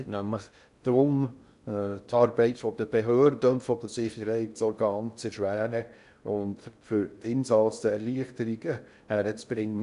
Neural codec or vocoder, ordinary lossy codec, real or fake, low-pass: codec, 16 kHz in and 24 kHz out, 0.6 kbps, FocalCodec, streaming, 2048 codes; none; fake; 10.8 kHz